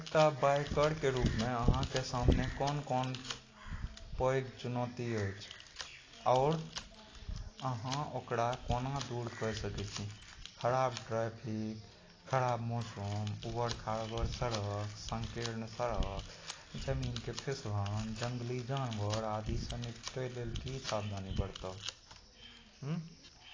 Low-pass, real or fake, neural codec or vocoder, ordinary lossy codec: 7.2 kHz; real; none; AAC, 32 kbps